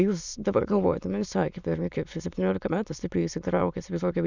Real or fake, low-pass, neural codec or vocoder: fake; 7.2 kHz; autoencoder, 22.05 kHz, a latent of 192 numbers a frame, VITS, trained on many speakers